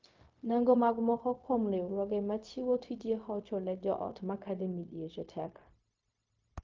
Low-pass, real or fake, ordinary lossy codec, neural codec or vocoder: 7.2 kHz; fake; Opus, 32 kbps; codec, 16 kHz, 0.4 kbps, LongCat-Audio-Codec